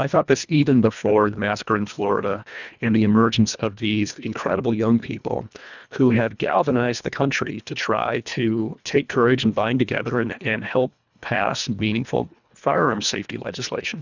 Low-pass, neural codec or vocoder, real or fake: 7.2 kHz; codec, 24 kHz, 1.5 kbps, HILCodec; fake